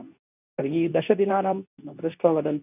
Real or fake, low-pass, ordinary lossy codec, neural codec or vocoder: fake; 3.6 kHz; none; codec, 24 kHz, 0.9 kbps, WavTokenizer, medium speech release version 2